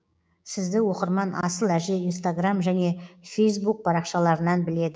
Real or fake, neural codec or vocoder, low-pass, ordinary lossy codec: fake; codec, 16 kHz, 6 kbps, DAC; none; none